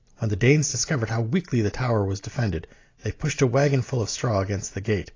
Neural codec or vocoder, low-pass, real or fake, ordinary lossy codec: none; 7.2 kHz; real; AAC, 32 kbps